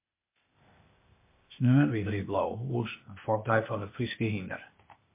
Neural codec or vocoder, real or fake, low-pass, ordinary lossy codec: codec, 16 kHz, 0.8 kbps, ZipCodec; fake; 3.6 kHz; MP3, 24 kbps